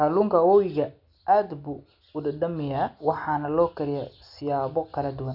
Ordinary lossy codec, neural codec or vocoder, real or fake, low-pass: none; none; real; 5.4 kHz